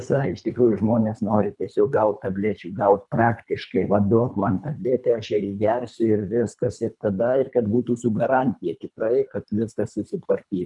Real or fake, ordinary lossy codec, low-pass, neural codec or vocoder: fake; MP3, 96 kbps; 10.8 kHz; codec, 24 kHz, 3 kbps, HILCodec